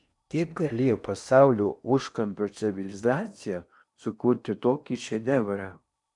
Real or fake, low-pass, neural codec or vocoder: fake; 10.8 kHz; codec, 16 kHz in and 24 kHz out, 0.8 kbps, FocalCodec, streaming, 65536 codes